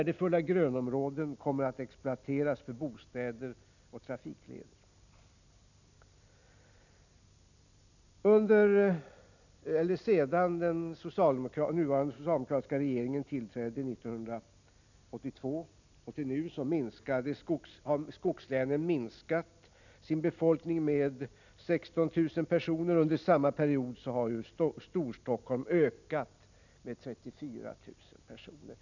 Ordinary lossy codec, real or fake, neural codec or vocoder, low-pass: none; real; none; 7.2 kHz